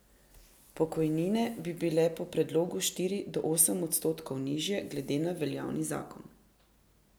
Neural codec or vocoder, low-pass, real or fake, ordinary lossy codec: none; none; real; none